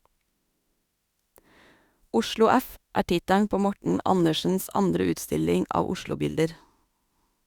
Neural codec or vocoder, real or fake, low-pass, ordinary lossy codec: autoencoder, 48 kHz, 32 numbers a frame, DAC-VAE, trained on Japanese speech; fake; 19.8 kHz; Opus, 64 kbps